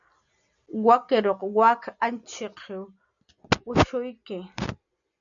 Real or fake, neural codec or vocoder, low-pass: real; none; 7.2 kHz